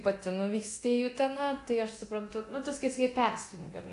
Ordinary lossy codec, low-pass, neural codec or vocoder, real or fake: AAC, 48 kbps; 10.8 kHz; codec, 24 kHz, 0.9 kbps, DualCodec; fake